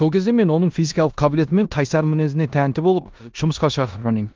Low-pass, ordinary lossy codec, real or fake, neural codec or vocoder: 7.2 kHz; Opus, 32 kbps; fake; codec, 16 kHz in and 24 kHz out, 0.9 kbps, LongCat-Audio-Codec, four codebook decoder